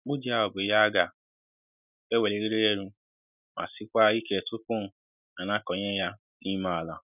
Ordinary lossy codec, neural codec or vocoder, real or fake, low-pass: none; none; real; 3.6 kHz